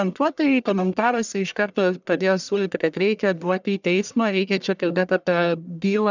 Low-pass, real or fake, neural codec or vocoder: 7.2 kHz; fake; codec, 44.1 kHz, 1.7 kbps, Pupu-Codec